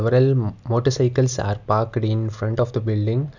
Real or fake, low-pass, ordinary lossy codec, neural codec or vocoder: real; 7.2 kHz; none; none